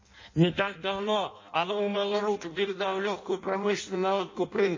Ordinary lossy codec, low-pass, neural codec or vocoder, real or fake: MP3, 32 kbps; 7.2 kHz; codec, 16 kHz in and 24 kHz out, 0.6 kbps, FireRedTTS-2 codec; fake